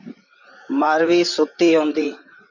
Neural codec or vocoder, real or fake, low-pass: vocoder, 44.1 kHz, 128 mel bands, Pupu-Vocoder; fake; 7.2 kHz